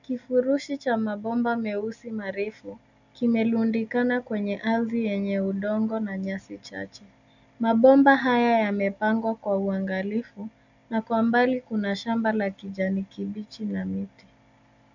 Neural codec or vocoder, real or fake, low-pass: none; real; 7.2 kHz